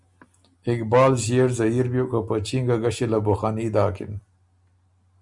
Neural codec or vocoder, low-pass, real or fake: none; 10.8 kHz; real